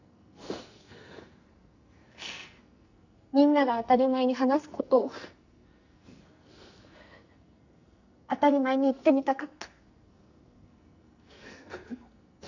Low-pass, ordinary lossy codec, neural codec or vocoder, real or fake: 7.2 kHz; none; codec, 44.1 kHz, 2.6 kbps, SNAC; fake